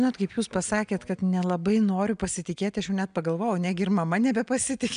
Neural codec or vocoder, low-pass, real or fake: none; 9.9 kHz; real